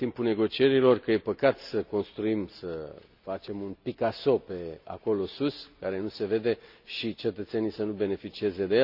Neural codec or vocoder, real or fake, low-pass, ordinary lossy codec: none; real; 5.4 kHz; none